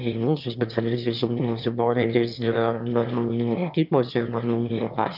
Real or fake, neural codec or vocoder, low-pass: fake; autoencoder, 22.05 kHz, a latent of 192 numbers a frame, VITS, trained on one speaker; 5.4 kHz